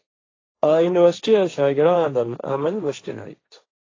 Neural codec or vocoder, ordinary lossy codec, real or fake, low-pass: codec, 16 kHz, 1.1 kbps, Voila-Tokenizer; AAC, 32 kbps; fake; 7.2 kHz